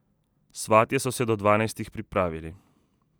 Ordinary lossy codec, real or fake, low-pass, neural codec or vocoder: none; real; none; none